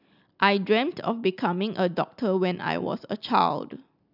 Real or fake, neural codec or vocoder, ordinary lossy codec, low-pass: real; none; none; 5.4 kHz